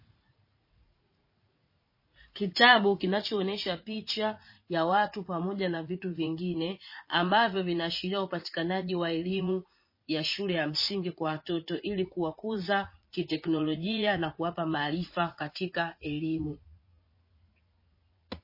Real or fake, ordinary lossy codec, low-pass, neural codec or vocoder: fake; MP3, 24 kbps; 5.4 kHz; vocoder, 44.1 kHz, 80 mel bands, Vocos